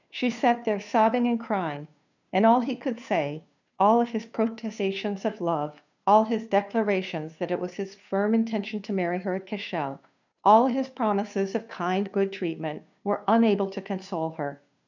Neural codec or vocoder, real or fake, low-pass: codec, 16 kHz, 2 kbps, FunCodec, trained on Chinese and English, 25 frames a second; fake; 7.2 kHz